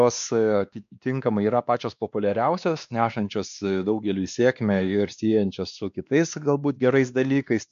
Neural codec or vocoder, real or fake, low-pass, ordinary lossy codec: codec, 16 kHz, 2 kbps, X-Codec, WavLM features, trained on Multilingual LibriSpeech; fake; 7.2 kHz; MP3, 64 kbps